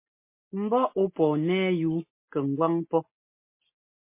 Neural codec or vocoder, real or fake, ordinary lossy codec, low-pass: none; real; MP3, 24 kbps; 3.6 kHz